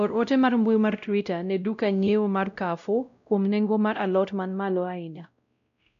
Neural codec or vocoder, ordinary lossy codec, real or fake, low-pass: codec, 16 kHz, 0.5 kbps, X-Codec, WavLM features, trained on Multilingual LibriSpeech; none; fake; 7.2 kHz